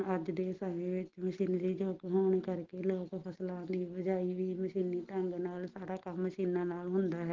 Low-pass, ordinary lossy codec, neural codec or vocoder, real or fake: 7.2 kHz; Opus, 16 kbps; none; real